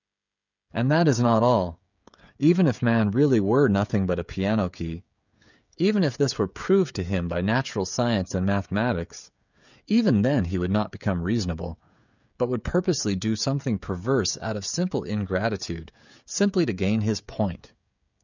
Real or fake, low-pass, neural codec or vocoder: fake; 7.2 kHz; codec, 16 kHz, 16 kbps, FreqCodec, smaller model